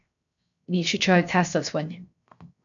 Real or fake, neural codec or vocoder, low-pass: fake; codec, 16 kHz, 0.7 kbps, FocalCodec; 7.2 kHz